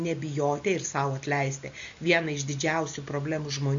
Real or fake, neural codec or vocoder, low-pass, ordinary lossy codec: real; none; 7.2 kHz; MP3, 48 kbps